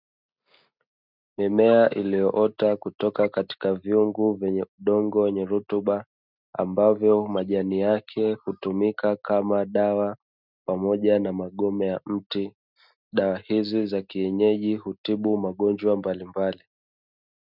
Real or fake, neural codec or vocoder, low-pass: real; none; 5.4 kHz